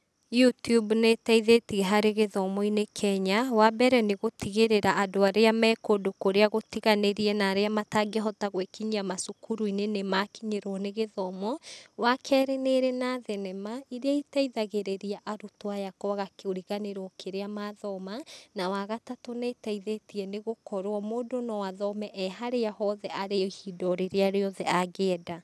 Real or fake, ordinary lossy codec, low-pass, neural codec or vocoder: real; none; none; none